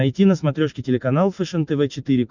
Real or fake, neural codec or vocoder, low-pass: real; none; 7.2 kHz